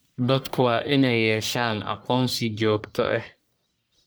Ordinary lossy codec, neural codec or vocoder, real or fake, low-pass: none; codec, 44.1 kHz, 1.7 kbps, Pupu-Codec; fake; none